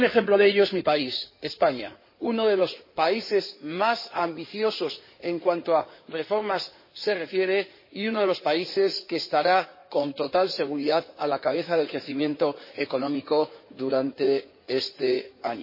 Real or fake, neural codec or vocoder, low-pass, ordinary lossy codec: fake; codec, 16 kHz in and 24 kHz out, 2.2 kbps, FireRedTTS-2 codec; 5.4 kHz; MP3, 24 kbps